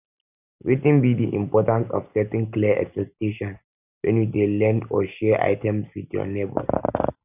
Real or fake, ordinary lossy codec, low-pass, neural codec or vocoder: real; none; 3.6 kHz; none